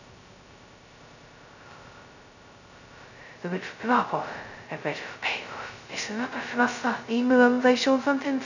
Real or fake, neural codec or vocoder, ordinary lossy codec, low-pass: fake; codec, 16 kHz, 0.2 kbps, FocalCodec; none; 7.2 kHz